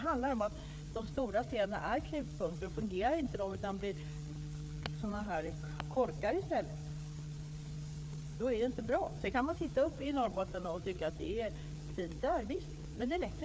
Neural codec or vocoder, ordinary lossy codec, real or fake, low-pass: codec, 16 kHz, 4 kbps, FreqCodec, larger model; none; fake; none